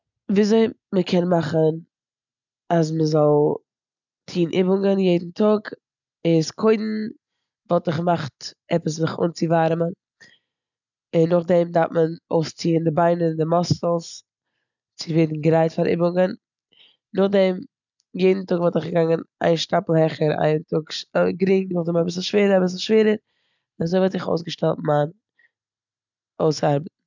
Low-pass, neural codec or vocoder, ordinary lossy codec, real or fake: 7.2 kHz; none; none; real